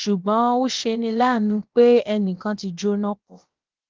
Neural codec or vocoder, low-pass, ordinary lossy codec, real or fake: codec, 16 kHz, about 1 kbps, DyCAST, with the encoder's durations; 7.2 kHz; Opus, 16 kbps; fake